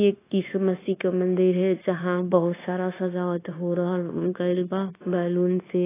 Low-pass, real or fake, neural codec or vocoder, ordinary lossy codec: 3.6 kHz; fake; codec, 16 kHz, 0.9 kbps, LongCat-Audio-Codec; AAC, 16 kbps